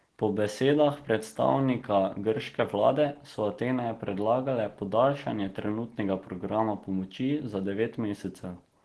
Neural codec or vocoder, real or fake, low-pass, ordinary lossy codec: none; real; 10.8 kHz; Opus, 16 kbps